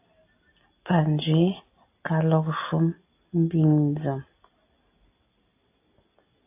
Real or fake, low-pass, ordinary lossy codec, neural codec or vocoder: real; 3.6 kHz; AAC, 24 kbps; none